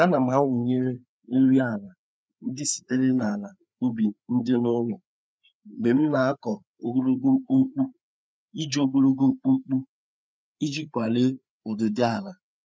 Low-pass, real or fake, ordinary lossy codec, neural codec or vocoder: none; fake; none; codec, 16 kHz, 4 kbps, FreqCodec, larger model